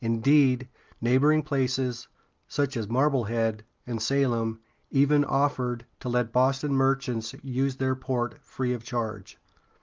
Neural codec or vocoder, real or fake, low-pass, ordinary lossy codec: none; real; 7.2 kHz; Opus, 32 kbps